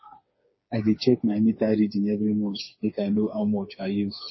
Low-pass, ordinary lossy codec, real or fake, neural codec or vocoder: 7.2 kHz; MP3, 24 kbps; fake; codec, 16 kHz, 4 kbps, FreqCodec, smaller model